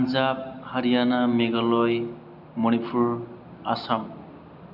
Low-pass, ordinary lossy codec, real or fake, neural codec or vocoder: 5.4 kHz; none; real; none